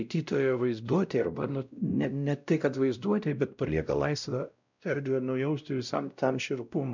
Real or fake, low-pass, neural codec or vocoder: fake; 7.2 kHz; codec, 16 kHz, 0.5 kbps, X-Codec, WavLM features, trained on Multilingual LibriSpeech